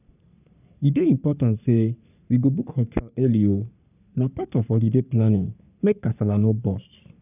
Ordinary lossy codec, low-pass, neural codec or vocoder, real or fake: none; 3.6 kHz; codec, 44.1 kHz, 3.4 kbps, Pupu-Codec; fake